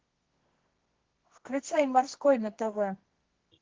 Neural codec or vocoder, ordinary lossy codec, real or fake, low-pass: codec, 24 kHz, 0.9 kbps, WavTokenizer, medium music audio release; Opus, 16 kbps; fake; 7.2 kHz